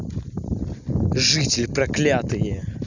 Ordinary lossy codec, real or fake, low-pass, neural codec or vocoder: none; real; 7.2 kHz; none